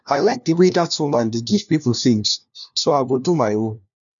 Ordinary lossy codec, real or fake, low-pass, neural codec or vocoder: none; fake; 7.2 kHz; codec, 16 kHz, 1 kbps, FunCodec, trained on LibriTTS, 50 frames a second